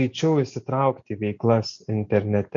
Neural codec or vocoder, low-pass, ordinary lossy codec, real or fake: none; 7.2 kHz; MP3, 48 kbps; real